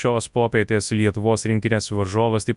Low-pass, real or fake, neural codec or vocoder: 10.8 kHz; fake; codec, 24 kHz, 0.9 kbps, WavTokenizer, large speech release